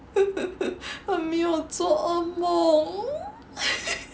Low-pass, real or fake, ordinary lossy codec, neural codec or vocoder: none; real; none; none